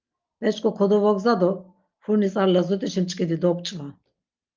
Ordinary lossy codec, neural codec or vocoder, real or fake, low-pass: Opus, 32 kbps; none; real; 7.2 kHz